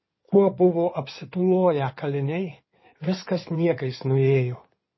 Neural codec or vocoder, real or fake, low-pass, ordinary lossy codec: codec, 16 kHz in and 24 kHz out, 2.2 kbps, FireRedTTS-2 codec; fake; 7.2 kHz; MP3, 24 kbps